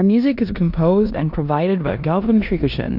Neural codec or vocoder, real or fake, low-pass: codec, 16 kHz in and 24 kHz out, 0.9 kbps, LongCat-Audio-Codec, four codebook decoder; fake; 5.4 kHz